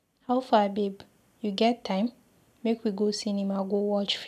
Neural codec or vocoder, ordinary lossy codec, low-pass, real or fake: none; none; 14.4 kHz; real